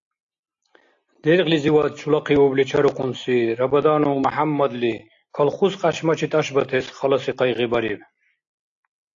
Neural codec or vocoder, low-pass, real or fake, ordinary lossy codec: none; 7.2 kHz; real; AAC, 48 kbps